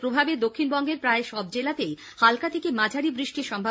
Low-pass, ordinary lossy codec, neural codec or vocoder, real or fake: none; none; none; real